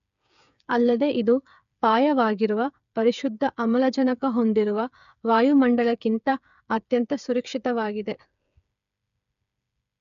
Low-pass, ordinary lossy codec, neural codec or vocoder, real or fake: 7.2 kHz; none; codec, 16 kHz, 8 kbps, FreqCodec, smaller model; fake